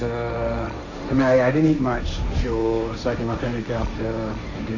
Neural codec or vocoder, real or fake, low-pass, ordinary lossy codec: codec, 16 kHz, 1.1 kbps, Voila-Tokenizer; fake; 7.2 kHz; none